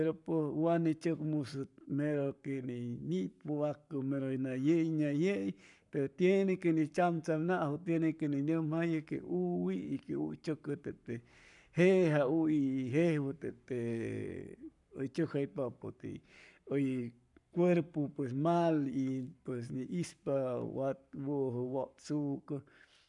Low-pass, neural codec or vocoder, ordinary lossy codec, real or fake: 10.8 kHz; codec, 44.1 kHz, 7.8 kbps, Pupu-Codec; none; fake